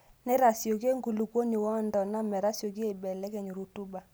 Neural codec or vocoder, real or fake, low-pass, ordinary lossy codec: none; real; none; none